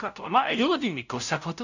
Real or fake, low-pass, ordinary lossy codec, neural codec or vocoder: fake; 7.2 kHz; none; codec, 16 kHz, 0.5 kbps, FunCodec, trained on LibriTTS, 25 frames a second